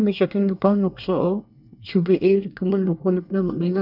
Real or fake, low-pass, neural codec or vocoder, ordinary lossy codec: fake; 5.4 kHz; codec, 24 kHz, 1 kbps, SNAC; none